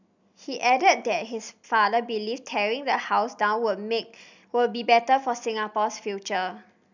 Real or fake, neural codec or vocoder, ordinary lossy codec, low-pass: real; none; none; 7.2 kHz